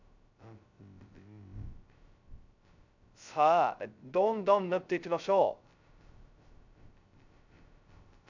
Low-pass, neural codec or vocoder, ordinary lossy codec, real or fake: 7.2 kHz; codec, 16 kHz, 0.2 kbps, FocalCodec; none; fake